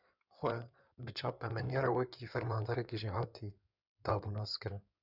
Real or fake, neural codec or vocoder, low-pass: fake; codec, 16 kHz in and 24 kHz out, 2.2 kbps, FireRedTTS-2 codec; 5.4 kHz